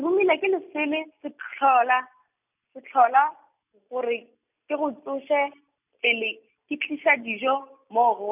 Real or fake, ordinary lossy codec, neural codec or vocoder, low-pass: real; none; none; 3.6 kHz